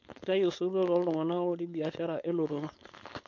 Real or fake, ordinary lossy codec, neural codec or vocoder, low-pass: fake; MP3, 48 kbps; codec, 16 kHz, 4.8 kbps, FACodec; 7.2 kHz